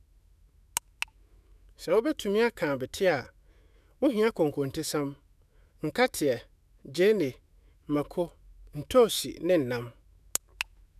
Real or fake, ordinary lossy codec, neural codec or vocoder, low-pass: fake; none; autoencoder, 48 kHz, 128 numbers a frame, DAC-VAE, trained on Japanese speech; 14.4 kHz